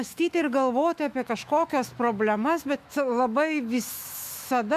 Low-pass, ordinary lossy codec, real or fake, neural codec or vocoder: 14.4 kHz; MP3, 96 kbps; fake; autoencoder, 48 kHz, 128 numbers a frame, DAC-VAE, trained on Japanese speech